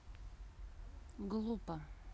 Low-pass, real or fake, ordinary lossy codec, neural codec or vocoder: none; real; none; none